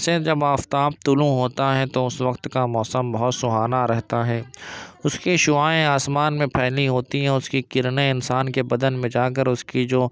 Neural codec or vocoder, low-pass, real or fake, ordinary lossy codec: none; none; real; none